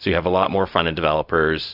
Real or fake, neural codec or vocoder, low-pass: fake; codec, 16 kHz, 0.4 kbps, LongCat-Audio-Codec; 5.4 kHz